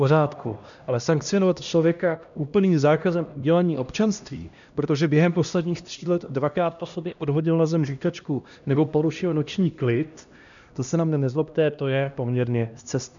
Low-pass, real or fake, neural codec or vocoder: 7.2 kHz; fake; codec, 16 kHz, 1 kbps, X-Codec, HuBERT features, trained on LibriSpeech